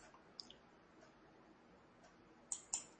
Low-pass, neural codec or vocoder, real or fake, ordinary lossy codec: 9.9 kHz; none; real; MP3, 32 kbps